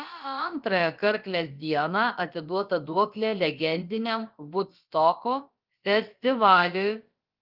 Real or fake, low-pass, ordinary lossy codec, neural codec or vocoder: fake; 5.4 kHz; Opus, 24 kbps; codec, 16 kHz, about 1 kbps, DyCAST, with the encoder's durations